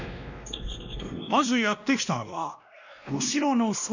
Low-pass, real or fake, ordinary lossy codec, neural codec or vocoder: 7.2 kHz; fake; none; codec, 16 kHz, 1 kbps, X-Codec, WavLM features, trained on Multilingual LibriSpeech